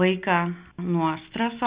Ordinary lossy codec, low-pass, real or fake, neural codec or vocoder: Opus, 64 kbps; 3.6 kHz; real; none